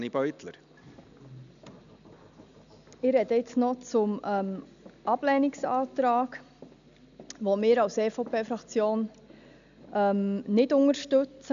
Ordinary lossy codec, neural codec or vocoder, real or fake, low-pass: none; none; real; 7.2 kHz